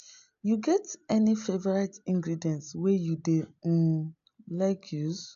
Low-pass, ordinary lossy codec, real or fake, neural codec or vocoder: 7.2 kHz; AAC, 64 kbps; real; none